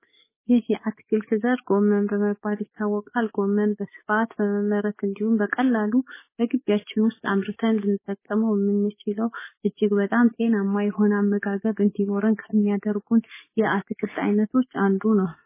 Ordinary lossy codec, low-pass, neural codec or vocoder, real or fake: MP3, 16 kbps; 3.6 kHz; codec, 44.1 kHz, 7.8 kbps, DAC; fake